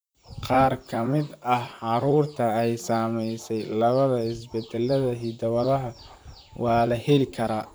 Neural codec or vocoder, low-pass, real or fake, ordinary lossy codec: vocoder, 44.1 kHz, 128 mel bands, Pupu-Vocoder; none; fake; none